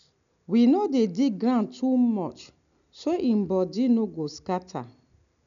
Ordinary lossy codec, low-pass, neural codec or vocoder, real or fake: none; 7.2 kHz; none; real